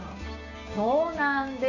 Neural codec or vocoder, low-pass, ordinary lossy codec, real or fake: codec, 16 kHz, 6 kbps, DAC; 7.2 kHz; none; fake